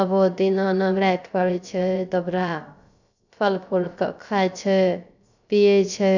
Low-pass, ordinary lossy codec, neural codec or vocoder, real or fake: 7.2 kHz; none; codec, 16 kHz, 0.3 kbps, FocalCodec; fake